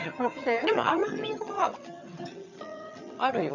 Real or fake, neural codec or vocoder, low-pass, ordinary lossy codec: fake; vocoder, 22.05 kHz, 80 mel bands, HiFi-GAN; 7.2 kHz; none